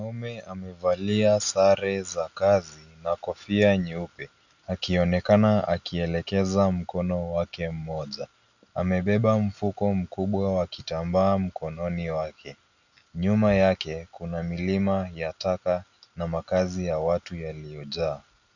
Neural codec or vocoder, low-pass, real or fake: none; 7.2 kHz; real